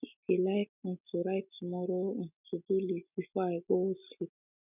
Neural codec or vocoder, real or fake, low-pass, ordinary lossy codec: none; real; 3.6 kHz; none